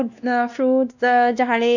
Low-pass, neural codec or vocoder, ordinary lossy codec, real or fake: 7.2 kHz; codec, 16 kHz, 1 kbps, X-Codec, WavLM features, trained on Multilingual LibriSpeech; none; fake